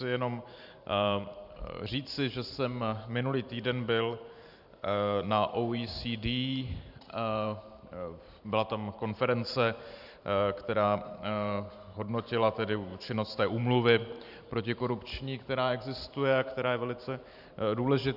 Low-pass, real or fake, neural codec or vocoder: 5.4 kHz; fake; vocoder, 44.1 kHz, 128 mel bands every 512 samples, BigVGAN v2